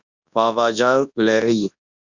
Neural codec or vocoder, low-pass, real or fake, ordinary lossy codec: codec, 24 kHz, 0.9 kbps, WavTokenizer, large speech release; 7.2 kHz; fake; Opus, 64 kbps